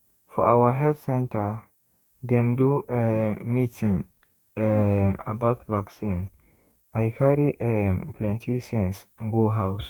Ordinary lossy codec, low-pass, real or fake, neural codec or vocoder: none; 19.8 kHz; fake; codec, 44.1 kHz, 2.6 kbps, DAC